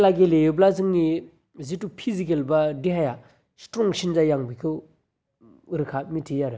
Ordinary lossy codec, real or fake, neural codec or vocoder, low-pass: none; real; none; none